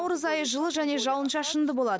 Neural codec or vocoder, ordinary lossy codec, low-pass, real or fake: none; none; none; real